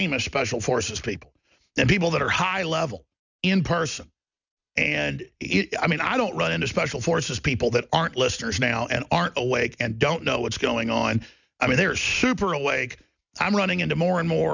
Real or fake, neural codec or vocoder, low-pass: real; none; 7.2 kHz